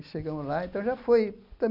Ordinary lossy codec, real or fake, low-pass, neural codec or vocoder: none; real; 5.4 kHz; none